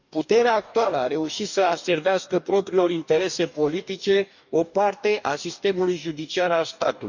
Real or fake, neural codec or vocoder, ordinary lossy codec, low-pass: fake; codec, 44.1 kHz, 2.6 kbps, DAC; none; 7.2 kHz